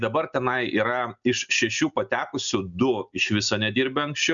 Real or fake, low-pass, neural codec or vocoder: real; 7.2 kHz; none